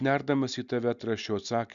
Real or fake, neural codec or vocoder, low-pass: real; none; 7.2 kHz